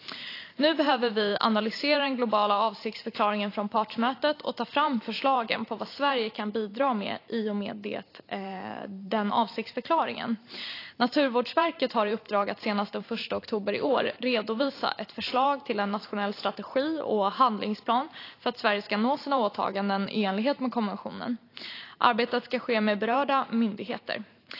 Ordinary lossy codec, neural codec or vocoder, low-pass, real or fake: AAC, 32 kbps; vocoder, 44.1 kHz, 128 mel bands every 512 samples, BigVGAN v2; 5.4 kHz; fake